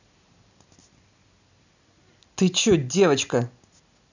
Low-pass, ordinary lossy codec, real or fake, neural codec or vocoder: 7.2 kHz; none; real; none